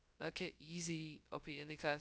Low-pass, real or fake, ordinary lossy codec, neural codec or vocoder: none; fake; none; codec, 16 kHz, 0.2 kbps, FocalCodec